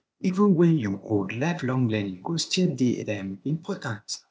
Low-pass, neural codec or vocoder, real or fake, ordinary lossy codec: none; codec, 16 kHz, 0.8 kbps, ZipCodec; fake; none